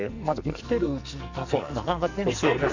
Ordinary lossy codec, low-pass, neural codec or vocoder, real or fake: none; 7.2 kHz; codec, 44.1 kHz, 2.6 kbps, SNAC; fake